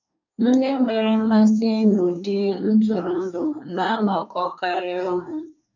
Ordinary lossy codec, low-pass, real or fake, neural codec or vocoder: none; 7.2 kHz; fake; codec, 24 kHz, 1 kbps, SNAC